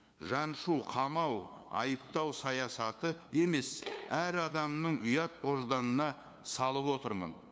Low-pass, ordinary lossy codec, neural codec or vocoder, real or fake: none; none; codec, 16 kHz, 2 kbps, FunCodec, trained on LibriTTS, 25 frames a second; fake